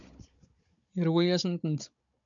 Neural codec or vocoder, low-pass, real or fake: codec, 16 kHz, 16 kbps, FunCodec, trained on Chinese and English, 50 frames a second; 7.2 kHz; fake